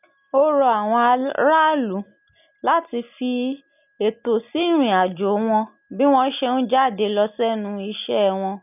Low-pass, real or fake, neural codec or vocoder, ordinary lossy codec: 3.6 kHz; real; none; none